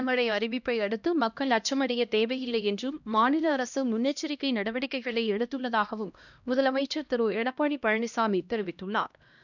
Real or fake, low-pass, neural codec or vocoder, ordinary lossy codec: fake; 7.2 kHz; codec, 16 kHz, 1 kbps, X-Codec, HuBERT features, trained on LibriSpeech; none